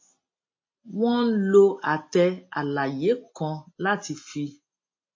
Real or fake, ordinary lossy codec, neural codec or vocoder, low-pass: real; MP3, 32 kbps; none; 7.2 kHz